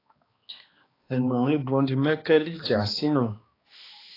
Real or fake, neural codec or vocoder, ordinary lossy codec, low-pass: fake; codec, 16 kHz, 2 kbps, X-Codec, HuBERT features, trained on balanced general audio; AAC, 24 kbps; 5.4 kHz